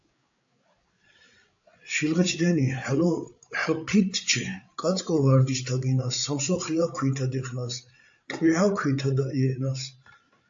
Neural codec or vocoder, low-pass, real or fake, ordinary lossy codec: codec, 16 kHz, 8 kbps, FreqCodec, larger model; 7.2 kHz; fake; AAC, 64 kbps